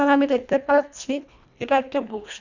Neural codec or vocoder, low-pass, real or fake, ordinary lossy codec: codec, 24 kHz, 1.5 kbps, HILCodec; 7.2 kHz; fake; none